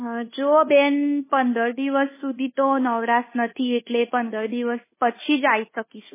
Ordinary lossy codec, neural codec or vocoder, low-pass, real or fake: MP3, 16 kbps; codec, 24 kHz, 1.2 kbps, DualCodec; 3.6 kHz; fake